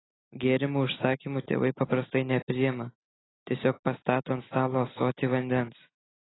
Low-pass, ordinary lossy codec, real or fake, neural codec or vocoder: 7.2 kHz; AAC, 16 kbps; real; none